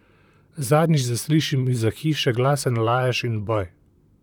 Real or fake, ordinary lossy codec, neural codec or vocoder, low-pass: fake; none; vocoder, 44.1 kHz, 128 mel bands, Pupu-Vocoder; 19.8 kHz